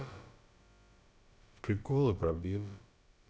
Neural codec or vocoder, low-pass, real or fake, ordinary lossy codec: codec, 16 kHz, about 1 kbps, DyCAST, with the encoder's durations; none; fake; none